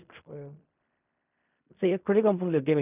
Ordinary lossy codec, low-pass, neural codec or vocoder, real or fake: none; 3.6 kHz; codec, 16 kHz in and 24 kHz out, 0.4 kbps, LongCat-Audio-Codec, fine tuned four codebook decoder; fake